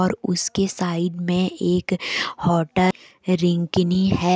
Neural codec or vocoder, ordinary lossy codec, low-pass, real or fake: none; none; none; real